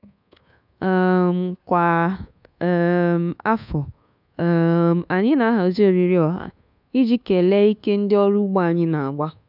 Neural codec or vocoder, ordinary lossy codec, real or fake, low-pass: codec, 24 kHz, 1.2 kbps, DualCodec; none; fake; 5.4 kHz